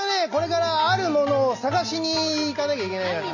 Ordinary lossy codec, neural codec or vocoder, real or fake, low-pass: none; none; real; 7.2 kHz